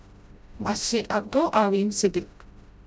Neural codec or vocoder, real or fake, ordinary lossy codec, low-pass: codec, 16 kHz, 0.5 kbps, FreqCodec, smaller model; fake; none; none